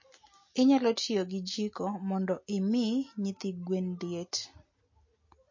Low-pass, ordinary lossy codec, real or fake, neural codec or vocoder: 7.2 kHz; MP3, 32 kbps; real; none